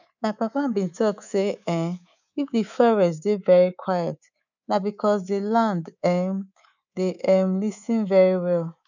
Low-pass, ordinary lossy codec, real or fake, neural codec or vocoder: 7.2 kHz; none; fake; codec, 24 kHz, 3.1 kbps, DualCodec